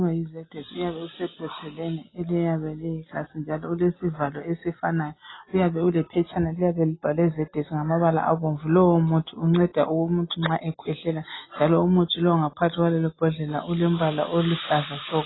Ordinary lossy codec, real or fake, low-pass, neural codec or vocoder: AAC, 16 kbps; real; 7.2 kHz; none